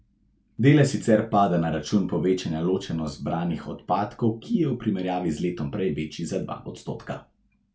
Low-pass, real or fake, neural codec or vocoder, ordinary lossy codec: none; real; none; none